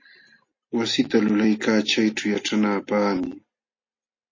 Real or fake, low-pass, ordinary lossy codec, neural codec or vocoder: real; 7.2 kHz; MP3, 32 kbps; none